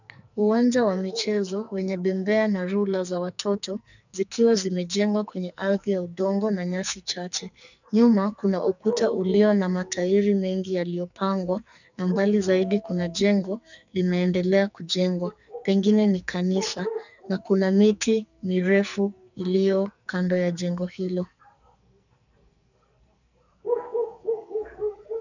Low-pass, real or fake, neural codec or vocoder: 7.2 kHz; fake; codec, 44.1 kHz, 2.6 kbps, SNAC